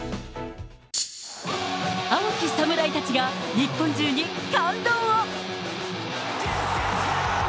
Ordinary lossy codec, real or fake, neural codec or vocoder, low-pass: none; real; none; none